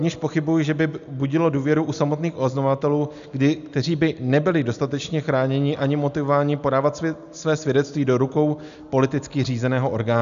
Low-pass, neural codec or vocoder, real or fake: 7.2 kHz; none; real